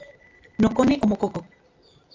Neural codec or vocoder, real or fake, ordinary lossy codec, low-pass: none; real; AAC, 32 kbps; 7.2 kHz